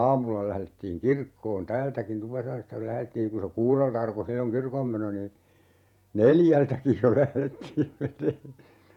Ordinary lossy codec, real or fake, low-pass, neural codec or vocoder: none; fake; 19.8 kHz; vocoder, 48 kHz, 128 mel bands, Vocos